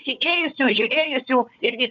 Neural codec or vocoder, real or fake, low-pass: codec, 16 kHz, 4 kbps, FunCodec, trained on Chinese and English, 50 frames a second; fake; 7.2 kHz